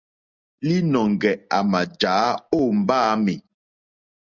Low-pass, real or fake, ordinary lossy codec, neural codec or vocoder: 7.2 kHz; real; Opus, 64 kbps; none